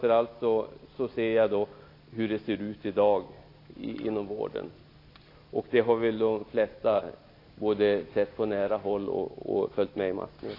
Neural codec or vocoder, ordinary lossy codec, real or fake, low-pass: none; AAC, 32 kbps; real; 5.4 kHz